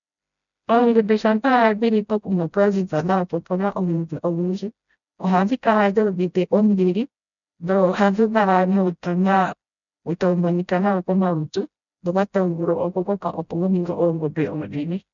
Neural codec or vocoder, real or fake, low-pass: codec, 16 kHz, 0.5 kbps, FreqCodec, smaller model; fake; 7.2 kHz